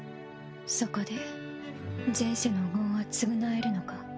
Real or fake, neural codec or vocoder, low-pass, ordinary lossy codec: real; none; none; none